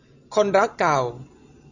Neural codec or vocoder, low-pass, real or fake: none; 7.2 kHz; real